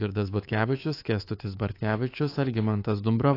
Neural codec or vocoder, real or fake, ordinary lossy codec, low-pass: codec, 24 kHz, 3.1 kbps, DualCodec; fake; AAC, 32 kbps; 5.4 kHz